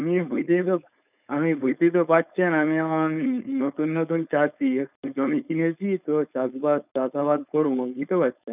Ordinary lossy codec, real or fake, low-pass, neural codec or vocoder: none; fake; 3.6 kHz; codec, 16 kHz, 4.8 kbps, FACodec